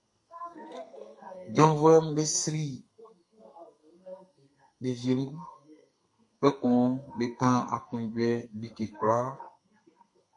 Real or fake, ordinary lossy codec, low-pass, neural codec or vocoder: fake; MP3, 48 kbps; 10.8 kHz; codec, 32 kHz, 1.9 kbps, SNAC